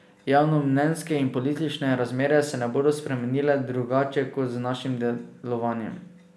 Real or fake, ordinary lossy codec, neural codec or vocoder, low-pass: real; none; none; none